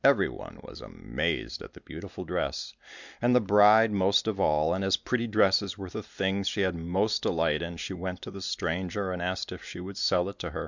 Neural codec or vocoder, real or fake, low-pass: none; real; 7.2 kHz